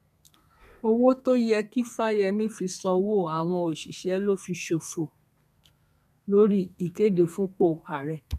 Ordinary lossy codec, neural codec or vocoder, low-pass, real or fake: none; codec, 32 kHz, 1.9 kbps, SNAC; 14.4 kHz; fake